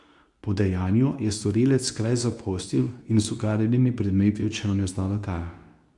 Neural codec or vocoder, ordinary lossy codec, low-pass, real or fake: codec, 24 kHz, 0.9 kbps, WavTokenizer, medium speech release version 2; none; 10.8 kHz; fake